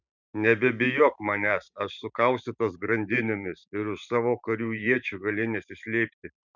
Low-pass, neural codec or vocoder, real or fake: 7.2 kHz; vocoder, 44.1 kHz, 128 mel bands every 512 samples, BigVGAN v2; fake